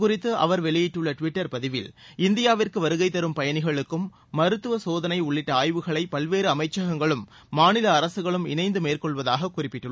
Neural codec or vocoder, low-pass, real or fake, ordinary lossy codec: none; none; real; none